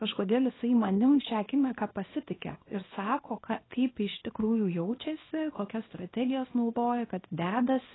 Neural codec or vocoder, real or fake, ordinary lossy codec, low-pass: codec, 24 kHz, 0.9 kbps, WavTokenizer, small release; fake; AAC, 16 kbps; 7.2 kHz